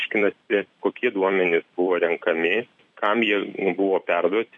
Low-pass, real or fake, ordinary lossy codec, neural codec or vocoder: 10.8 kHz; fake; MP3, 96 kbps; vocoder, 44.1 kHz, 128 mel bands every 256 samples, BigVGAN v2